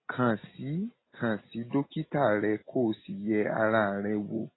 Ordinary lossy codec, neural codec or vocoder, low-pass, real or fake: AAC, 16 kbps; none; 7.2 kHz; real